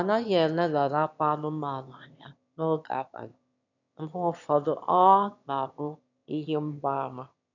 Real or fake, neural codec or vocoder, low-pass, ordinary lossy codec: fake; autoencoder, 22.05 kHz, a latent of 192 numbers a frame, VITS, trained on one speaker; 7.2 kHz; none